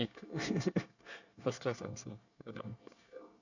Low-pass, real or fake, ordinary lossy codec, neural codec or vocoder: 7.2 kHz; fake; none; codec, 24 kHz, 1 kbps, SNAC